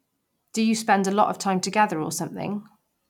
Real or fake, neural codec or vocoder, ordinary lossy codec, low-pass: real; none; none; 19.8 kHz